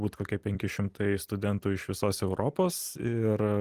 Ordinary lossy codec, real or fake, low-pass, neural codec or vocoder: Opus, 24 kbps; fake; 14.4 kHz; vocoder, 44.1 kHz, 128 mel bands every 256 samples, BigVGAN v2